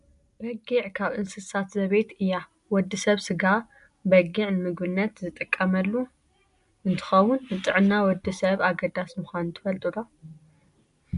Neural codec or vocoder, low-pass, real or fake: none; 10.8 kHz; real